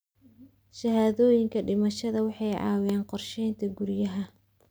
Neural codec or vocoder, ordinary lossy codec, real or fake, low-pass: none; none; real; none